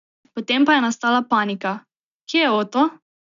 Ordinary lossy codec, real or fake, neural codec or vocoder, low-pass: none; real; none; 7.2 kHz